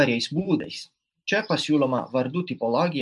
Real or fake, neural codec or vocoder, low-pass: real; none; 10.8 kHz